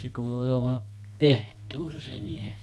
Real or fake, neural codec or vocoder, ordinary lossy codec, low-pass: fake; codec, 24 kHz, 0.9 kbps, WavTokenizer, medium music audio release; none; none